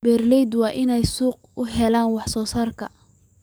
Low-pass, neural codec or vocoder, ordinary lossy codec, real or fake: none; codec, 44.1 kHz, 7.8 kbps, DAC; none; fake